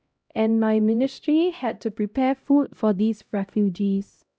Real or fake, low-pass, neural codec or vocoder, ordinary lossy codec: fake; none; codec, 16 kHz, 0.5 kbps, X-Codec, HuBERT features, trained on LibriSpeech; none